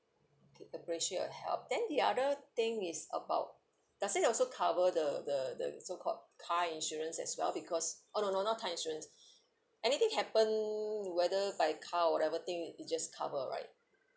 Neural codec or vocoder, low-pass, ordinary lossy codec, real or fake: none; none; none; real